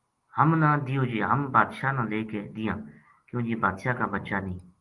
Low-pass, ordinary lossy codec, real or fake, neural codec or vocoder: 10.8 kHz; Opus, 24 kbps; real; none